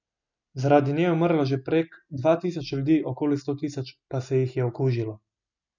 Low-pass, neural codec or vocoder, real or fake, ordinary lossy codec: 7.2 kHz; none; real; none